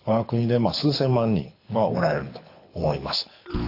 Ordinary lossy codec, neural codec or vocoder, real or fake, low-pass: AAC, 32 kbps; codec, 24 kHz, 6 kbps, HILCodec; fake; 5.4 kHz